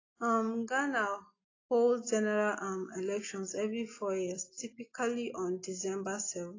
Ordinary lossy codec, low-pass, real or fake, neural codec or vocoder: AAC, 32 kbps; 7.2 kHz; real; none